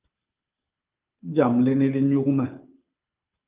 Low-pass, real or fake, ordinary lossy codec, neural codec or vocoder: 3.6 kHz; fake; Opus, 32 kbps; codec, 24 kHz, 6 kbps, HILCodec